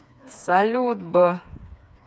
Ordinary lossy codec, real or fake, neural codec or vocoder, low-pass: none; fake; codec, 16 kHz, 4 kbps, FreqCodec, smaller model; none